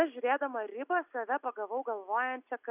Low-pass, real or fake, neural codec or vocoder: 3.6 kHz; real; none